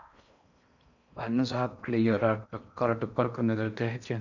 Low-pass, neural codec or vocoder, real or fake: 7.2 kHz; codec, 16 kHz in and 24 kHz out, 0.6 kbps, FocalCodec, streaming, 4096 codes; fake